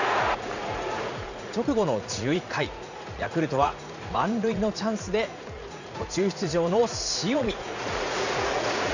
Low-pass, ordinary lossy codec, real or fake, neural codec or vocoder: 7.2 kHz; none; fake; vocoder, 44.1 kHz, 80 mel bands, Vocos